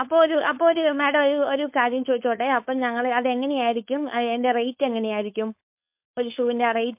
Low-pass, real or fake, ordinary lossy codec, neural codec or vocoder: 3.6 kHz; fake; MP3, 32 kbps; codec, 16 kHz, 4.8 kbps, FACodec